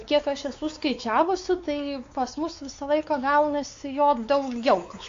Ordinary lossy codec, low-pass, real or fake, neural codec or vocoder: AAC, 48 kbps; 7.2 kHz; fake; codec, 16 kHz, 4 kbps, X-Codec, WavLM features, trained on Multilingual LibriSpeech